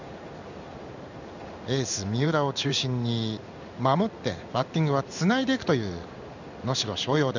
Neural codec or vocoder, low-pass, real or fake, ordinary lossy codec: codec, 16 kHz in and 24 kHz out, 1 kbps, XY-Tokenizer; 7.2 kHz; fake; none